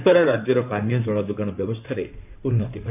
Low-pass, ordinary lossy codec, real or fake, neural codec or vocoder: 3.6 kHz; none; fake; codec, 16 kHz in and 24 kHz out, 2.2 kbps, FireRedTTS-2 codec